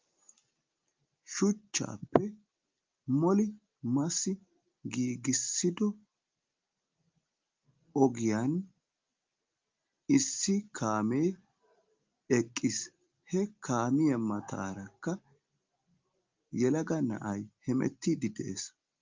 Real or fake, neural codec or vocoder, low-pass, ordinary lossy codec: real; none; 7.2 kHz; Opus, 32 kbps